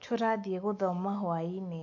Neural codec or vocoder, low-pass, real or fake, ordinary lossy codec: none; 7.2 kHz; real; AAC, 48 kbps